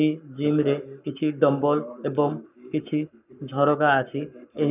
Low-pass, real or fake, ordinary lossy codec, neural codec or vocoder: 3.6 kHz; fake; none; codec, 44.1 kHz, 7.8 kbps, Pupu-Codec